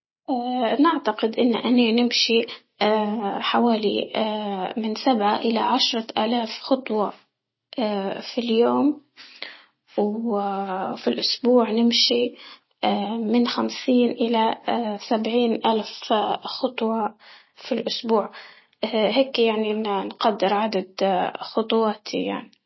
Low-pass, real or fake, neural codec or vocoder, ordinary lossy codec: 7.2 kHz; real; none; MP3, 24 kbps